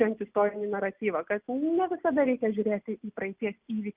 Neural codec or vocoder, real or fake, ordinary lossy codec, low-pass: none; real; Opus, 32 kbps; 3.6 kHz